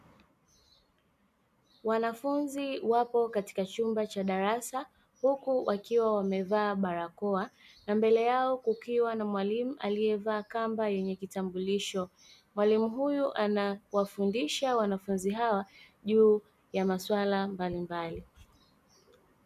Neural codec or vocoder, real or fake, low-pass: none; real; 14.4 kHz